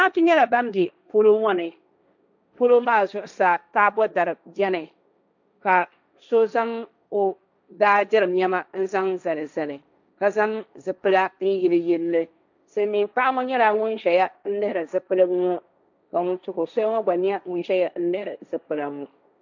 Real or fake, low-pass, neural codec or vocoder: fake; 7.2 kHz; codec, 16 kHz, 1.1 kbps, Voila-Tokenizer